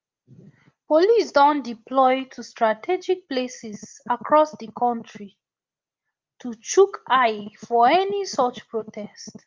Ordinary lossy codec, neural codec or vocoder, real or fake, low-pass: Opus, 24 kbps; none; real; 7.2 kHz